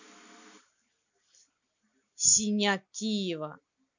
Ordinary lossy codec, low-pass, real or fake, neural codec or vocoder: none; 7.2 kHz; real; none